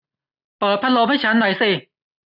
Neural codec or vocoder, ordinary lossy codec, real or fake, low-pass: none; none; real; 5.4 kHz